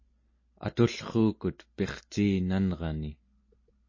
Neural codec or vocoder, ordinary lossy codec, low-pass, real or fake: none; MP3, 32 kbps; 7.2 kHz; real